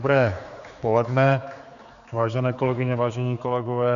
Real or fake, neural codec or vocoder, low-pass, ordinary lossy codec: fake; codec, 16 kHz, 4 kbps, X-Codec, HuBERT features, trained on general audio; 7.2 kHz; MP3, 96 kbps